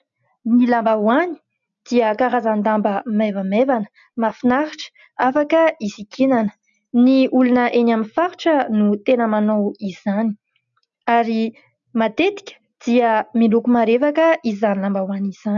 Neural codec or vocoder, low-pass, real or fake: none; 7.2 kHz; real